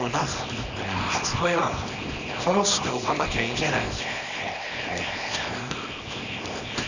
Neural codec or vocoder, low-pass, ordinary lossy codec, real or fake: codec, 24 kHz, 0.9 kbps, WavTokenizer, small release; 7.2 kHz; none; fake